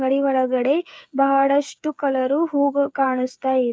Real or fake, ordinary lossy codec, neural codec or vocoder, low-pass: fake; none; codec, 16 kHz, 8 kbps, FreqCodec, smaller model; none